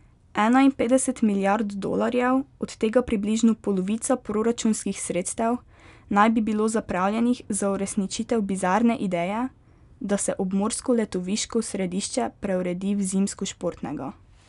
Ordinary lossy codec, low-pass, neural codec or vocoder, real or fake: none; 10.8 kHz; none; real